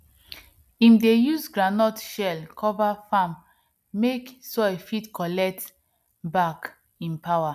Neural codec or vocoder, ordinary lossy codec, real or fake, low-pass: none; none; real; 14.4 kHz